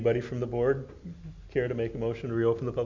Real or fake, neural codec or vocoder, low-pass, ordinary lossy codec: real; none; 7.2 kHz; MP3, 48 kbps